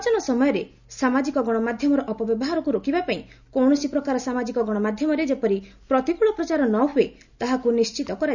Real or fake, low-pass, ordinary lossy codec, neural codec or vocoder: real; 7.2 kHz; none; none